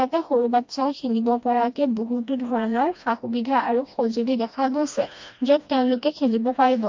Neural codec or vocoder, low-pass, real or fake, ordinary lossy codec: codec, 16 kHz, 1 kbps, FreqCodec, smaller model; 7.2 kHz; fake; MP3, 48 kbps